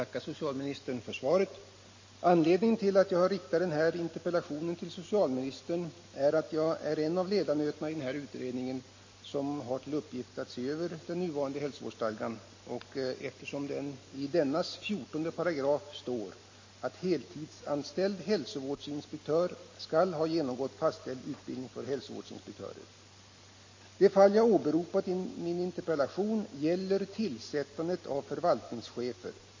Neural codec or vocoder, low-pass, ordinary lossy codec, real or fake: none; 7.2 kHz; MP3, 32 kbps; real